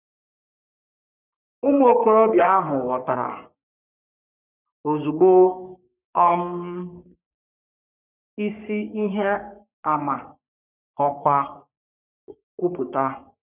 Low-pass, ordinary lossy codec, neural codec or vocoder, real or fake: 3.6 kHz; none; codec, 44.1 kHz, 3.4 kbps, Pupu-Codec; fake